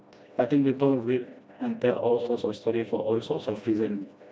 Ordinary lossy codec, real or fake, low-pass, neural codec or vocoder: none; fake; none; codec, 16 kHz, 1 kbps, FreqCodec, smaller model